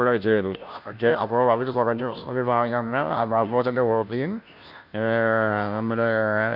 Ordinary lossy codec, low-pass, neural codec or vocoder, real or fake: none; 5.4 kHz; codec, 16 kHz, 0.5 kbps, FunCodec, trained on Chinese and English, 25 frames a second; fake